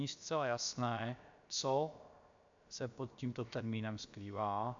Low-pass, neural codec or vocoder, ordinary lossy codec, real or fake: 7.2 kHz; codec, 16 kHz, about 1 kbps, DyCAST, with the encoder's durations; AAC, 64 kbps; fake